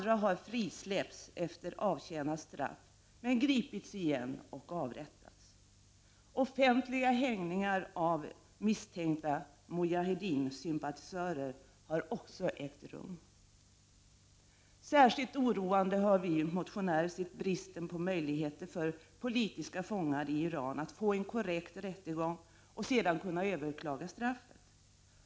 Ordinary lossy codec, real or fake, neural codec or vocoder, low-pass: none; real; none; none